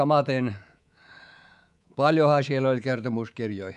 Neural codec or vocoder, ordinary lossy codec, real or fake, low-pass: codec, 24 kHz, 3.1 kbps, DualCodec; none; fake; 10.8 kHz